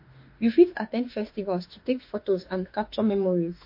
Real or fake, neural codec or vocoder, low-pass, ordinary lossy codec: fake; autoencoder, 48 kHz, 32 numbers a frame, DAC-VAE, trained on Japanese speech; 5.4 kHz; MP3, 32 kbps